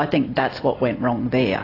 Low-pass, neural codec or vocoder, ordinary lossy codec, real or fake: 5.4 kHz; none; AAC, 24 kbps; real